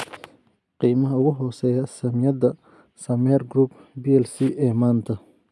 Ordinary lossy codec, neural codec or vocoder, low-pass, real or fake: none; none; none; real